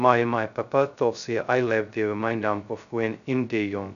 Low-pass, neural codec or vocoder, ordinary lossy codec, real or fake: 7.2 kHz; codec, 16 kHz, 0.2 kbps, FocalCodec; none; fake